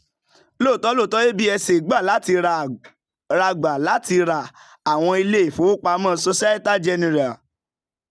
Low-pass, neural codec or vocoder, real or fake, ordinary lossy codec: none; none; real; none